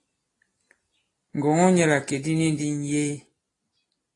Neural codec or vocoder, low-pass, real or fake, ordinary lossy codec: none; 10.8 kHz; real; AAC, 32 kbps